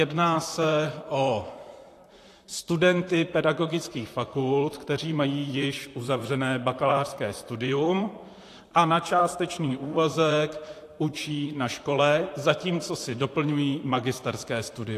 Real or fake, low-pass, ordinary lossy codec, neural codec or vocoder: fake; 14.4 kHz; AAC, 64 kbps; vocoder, 44.1 kHz, 128 mel bands, Pupu-Vocoder